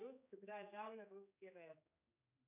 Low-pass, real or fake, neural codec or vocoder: 3.6 kHz; fake; codec, 16 kHz, 2 kbps, X-Codec, HuBERT features, trained on general audio